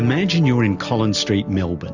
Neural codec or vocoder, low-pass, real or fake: none; 7.2 kHz; real